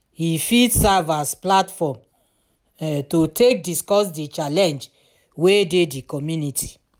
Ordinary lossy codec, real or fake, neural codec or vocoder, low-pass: none; real; none; none